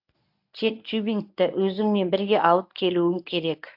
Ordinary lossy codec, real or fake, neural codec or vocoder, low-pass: AAC, 48 kbps; fake; codec, 24 kHz, 0.9 kbps, WavTokenizer, medium speech release version 1; 5.4 kHz